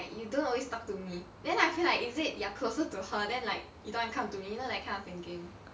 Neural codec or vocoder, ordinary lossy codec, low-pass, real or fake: none; none; none; real